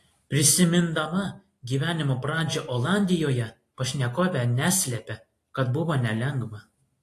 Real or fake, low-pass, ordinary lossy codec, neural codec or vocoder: real; 14.4 kHz; AAC, 48 kbps; none